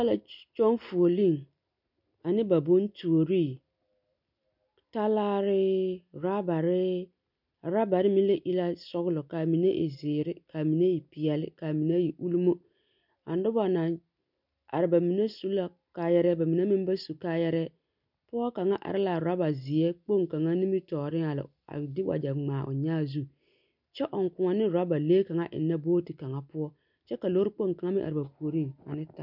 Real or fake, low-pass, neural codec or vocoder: real; 5.4 kHz; none